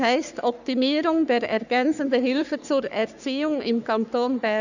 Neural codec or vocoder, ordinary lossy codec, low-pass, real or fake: codec, 44.1 kHz, 3.4 kbps, Pupu-Codec; none; 7.2 kHz; fake